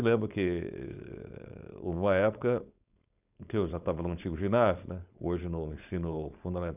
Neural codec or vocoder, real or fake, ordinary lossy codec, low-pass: codec, 16 kHz, 4.8 kbps, FACodec; fake; none; 3.6 kHz